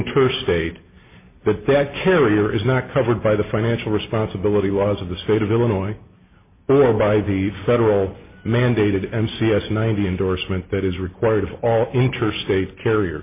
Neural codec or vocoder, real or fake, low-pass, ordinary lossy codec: none; real; 3.6 kHz; MP3, 24 kbps